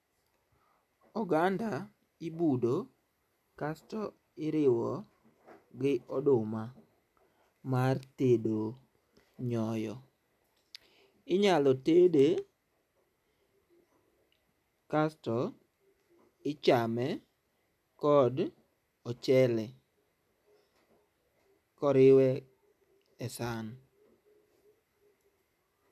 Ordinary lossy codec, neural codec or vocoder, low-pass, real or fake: none; none; 14.4 kHz; real